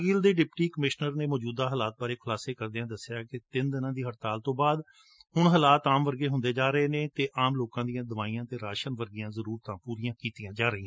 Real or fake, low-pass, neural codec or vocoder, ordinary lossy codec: real; none; none; none